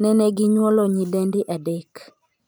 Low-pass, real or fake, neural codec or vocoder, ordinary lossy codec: none; fake; vocoder, 44.1 kHz, 128 mel bands every 512 samples, BigVGAN v2; none